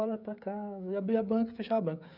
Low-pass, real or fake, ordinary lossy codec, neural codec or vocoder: 5.4 kHz; fake; none; codec, 16 kHz, 16 kbps, FreqCodec, smaller model